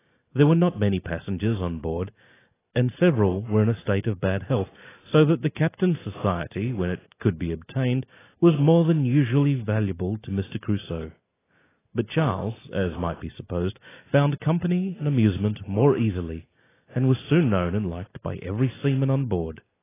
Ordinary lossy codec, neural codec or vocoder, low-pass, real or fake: AAC, 16 kbps; none; 3.6 kHz; real